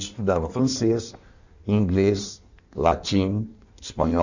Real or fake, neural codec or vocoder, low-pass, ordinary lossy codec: fake; codec, 16 kHz in and 24 kHz out, 1.1 kbps, FireRedTTS-2 codec; 7.2 kHz; none